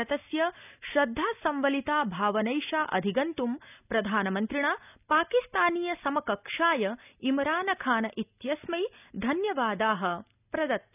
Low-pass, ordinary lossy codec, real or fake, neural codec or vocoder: 3.6 kHz; none; real; none